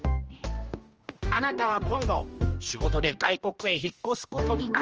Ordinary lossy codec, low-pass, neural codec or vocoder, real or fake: Opus, 24 kbps; 7.2 kHz; codec, 16 kHz, 1 kbps, X-Codec, HuBERT features, trained on general audio; fake